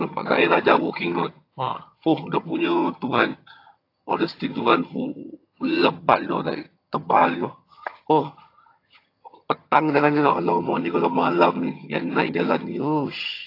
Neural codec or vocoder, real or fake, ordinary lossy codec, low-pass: vocoder, 22.05 kHz, 80 mel bands, HiFi-GAN; fake; AAC, 32 kbps; 5.4 kHz